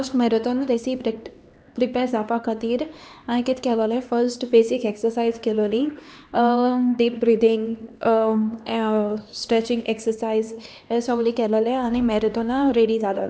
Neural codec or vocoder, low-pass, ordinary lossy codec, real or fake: codec, 16 kHz, 2 kbps, X-Codec, HuBERT features, trained on LibriSpeech; none; none; fake